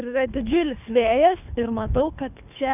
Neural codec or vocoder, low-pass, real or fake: codec, 24 kHz, 3 kbps, HILCodec; 3.6 kHz; fake